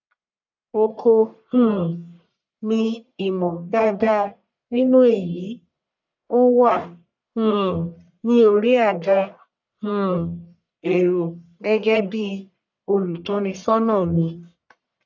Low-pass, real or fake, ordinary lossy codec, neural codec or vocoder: 7.2 kHz; fake; none; codec, 44.1 kHz, 1.7 kbps, Pupu-Codec